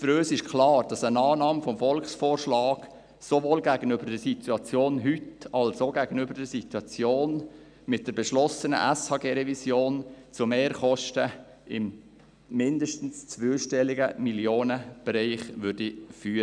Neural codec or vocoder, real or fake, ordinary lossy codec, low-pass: none; real; none; 9.9 kHz